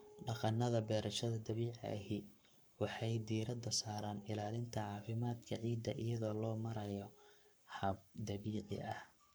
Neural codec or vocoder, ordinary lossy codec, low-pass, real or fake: codec, 44.1 kHz, 7.8 kbps, Pupu-Codec; none; none; fake